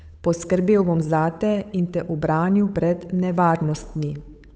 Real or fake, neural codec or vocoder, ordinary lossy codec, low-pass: fake; codec, 16 kHz, 8 kbps, FunCodec, trained on Chinese and English, 25 frames a second; none; none